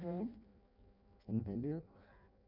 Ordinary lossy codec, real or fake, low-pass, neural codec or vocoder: none; fake; 5.4 kHz; codec, 16 kHz in and 24 kHz out, 0.6 kbps, FireRedTTS-2 codec